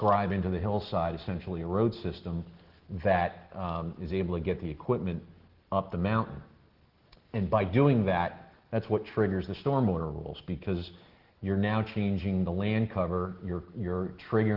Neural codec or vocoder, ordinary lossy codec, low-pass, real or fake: none; Opus, 16 kbps; 5.4 kHz; real